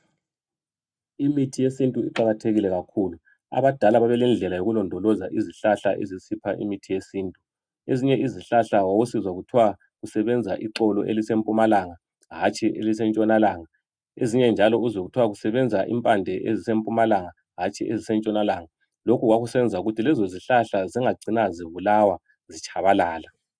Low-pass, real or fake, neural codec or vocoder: 9.9 kHz; real; none